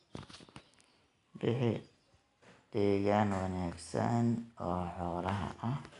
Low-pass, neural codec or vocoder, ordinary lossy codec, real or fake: 10.8 kHz; none; none; real